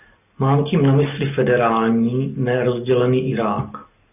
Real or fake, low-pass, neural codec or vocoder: real; 3.6 kHz; none